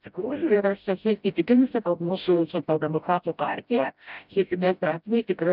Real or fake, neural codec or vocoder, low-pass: fake; codec, 16 kHz, 0.5 kbps, FreqCodec, smaller model; 5.4 kHz